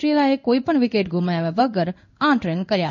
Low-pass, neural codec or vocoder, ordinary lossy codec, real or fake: 7.2 kHz; codec, 16 kHz in and 24 kHz out, 1 kbps, XY-Tokenizer; none; fake